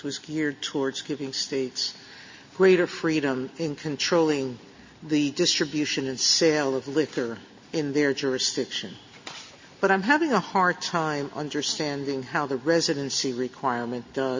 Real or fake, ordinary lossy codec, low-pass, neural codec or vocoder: real; MP3, 32 kbps; 7.2 kHz; none